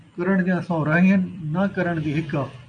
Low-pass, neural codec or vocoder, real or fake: 9.9 kHz; none; real